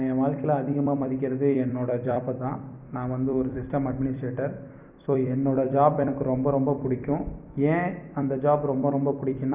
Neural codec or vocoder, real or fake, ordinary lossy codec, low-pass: vocoder, 44.1 kHz, 128 mel bands every 256 samples, BigVGAN v2; fake; Opus, 64 kbps; 3.6 kHz